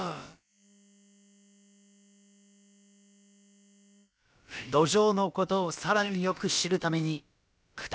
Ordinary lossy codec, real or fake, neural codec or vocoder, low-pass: none; fake; codec, 16 kHz, about 1 kbps, DyCAST, with the encoder's durations; none